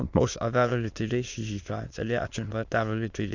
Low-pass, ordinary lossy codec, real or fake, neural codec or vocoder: 7.2 kHz; Opus, 64 kbps; fake; autoencoder, 22.05 kHz, a latent of 192 numbers a frame, VITS, trained on many speakers